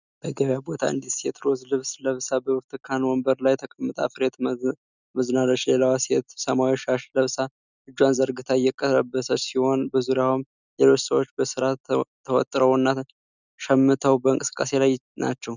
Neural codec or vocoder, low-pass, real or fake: none; 7.2 kHz; real